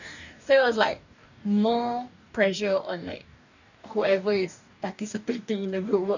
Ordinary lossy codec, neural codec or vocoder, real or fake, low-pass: none; codec, 44.1 kHz, 2.6 kbps, DAC; fake; 7.2 kHz